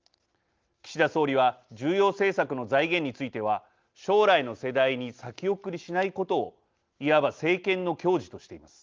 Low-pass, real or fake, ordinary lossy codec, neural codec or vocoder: 7.2 kHz; real; Opus, 32 kbps; none